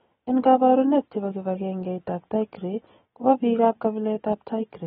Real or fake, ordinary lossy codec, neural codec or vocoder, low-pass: real; AAC, 16 kbps; none; 19.8 kHz